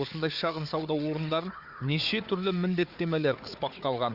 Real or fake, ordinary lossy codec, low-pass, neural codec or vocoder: fake; Opus, 64 kbps; 5.4 kHz; codec, 16 kHz, 4 kbps, FunCodec, trained on Chinese and English, 50 frames a second